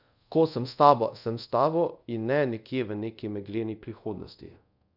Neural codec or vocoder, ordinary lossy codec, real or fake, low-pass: codec, 24 kHz, 0.5 kbps, DualCodec; none; fake; 5.4 kHz